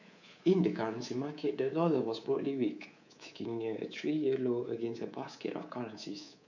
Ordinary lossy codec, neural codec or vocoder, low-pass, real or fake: none; codec, 24 kHz, 3.1 kbps, DualCodec; 7.2 kHz; fake